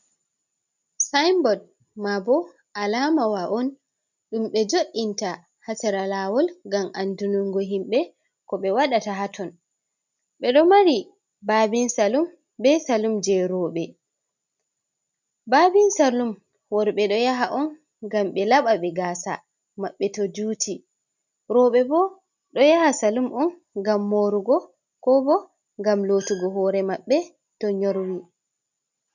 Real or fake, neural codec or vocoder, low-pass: real; none; 7.2 kHz